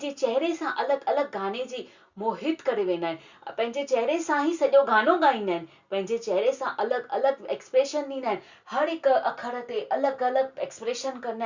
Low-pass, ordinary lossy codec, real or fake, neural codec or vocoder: 7.2 kHz; Opus, 64 kbps; real; none